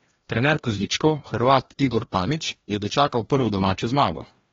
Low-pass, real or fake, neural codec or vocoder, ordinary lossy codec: 7.2 kHz; fake; codec, 16 kHz, 1 kbps, FreqCodec, larger model; AAC, 24 kbps